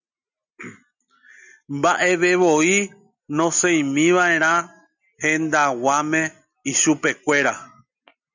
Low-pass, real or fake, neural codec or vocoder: 7.2 kHz; real; none